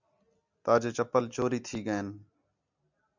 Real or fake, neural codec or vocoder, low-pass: real; none; 7.2 kHz